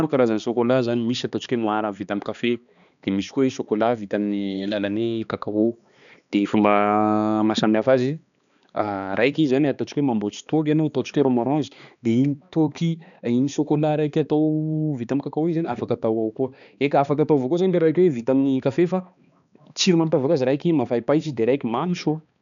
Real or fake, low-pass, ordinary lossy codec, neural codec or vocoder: fake; 7.2 kHz; none; codec, 16 kHz, 2 kbps, X-Codec, HuBERT features, trained on balanced general audio